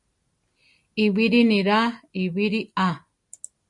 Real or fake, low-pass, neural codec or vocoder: real; 10.8 kHz; none